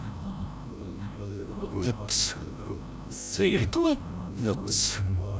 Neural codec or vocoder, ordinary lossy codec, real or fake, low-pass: codec, 16 kHz, 0.5 kbps, FreqCodec, larger model; none; fake; none